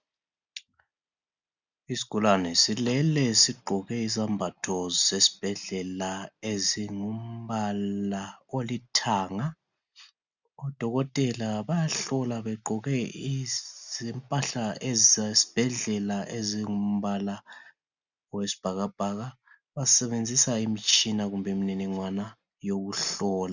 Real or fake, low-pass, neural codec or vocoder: real; 7.2 kHz; none